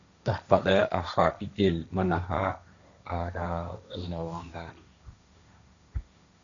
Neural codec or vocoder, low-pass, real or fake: codec, 16 kHz, 1.1 kbps, Voila-Tokenizer; 7.2 kHz; fake